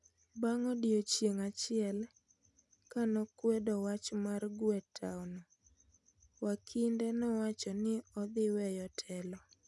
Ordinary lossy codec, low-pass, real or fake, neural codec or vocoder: none; none; real; none